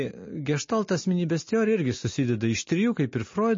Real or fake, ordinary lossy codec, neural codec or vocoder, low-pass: real; MP3, 32 kbps; none; 7.2 kHz